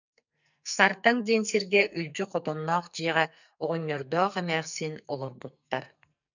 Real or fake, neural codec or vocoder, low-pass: fake; codec, 44.1 kHz, 2.6 kbps, SNAC; 7.2 kHz